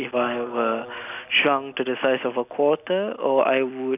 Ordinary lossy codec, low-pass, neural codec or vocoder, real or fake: none; 3.6 kHz; none; real